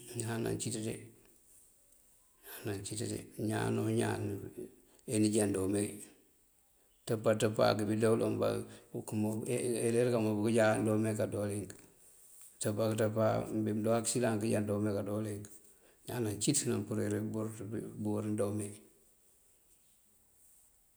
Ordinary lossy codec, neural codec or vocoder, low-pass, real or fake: none; none; none; real